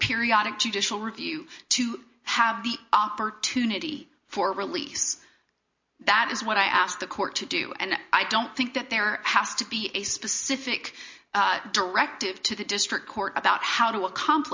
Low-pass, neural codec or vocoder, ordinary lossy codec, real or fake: 7.2 kHz; none; MP3, 48 kbps; real